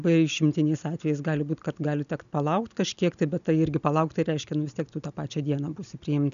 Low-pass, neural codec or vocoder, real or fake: 7.2 kHz; none; real